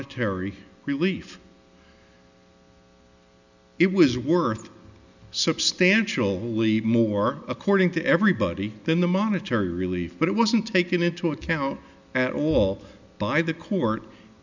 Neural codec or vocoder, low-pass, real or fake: none; 7.2 kHz; real